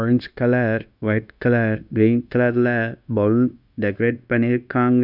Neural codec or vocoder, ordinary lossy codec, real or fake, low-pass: codec, 16 kHz, 0.9 kbps, LongCat-Audio-Codec; none; fake; 5.4 kHz